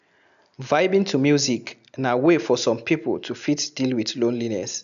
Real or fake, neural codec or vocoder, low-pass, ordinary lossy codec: real; none; 7.2 kHz; none